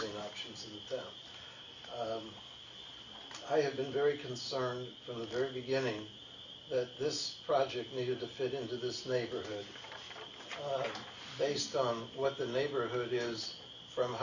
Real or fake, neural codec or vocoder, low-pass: real; none; 7.2 kHz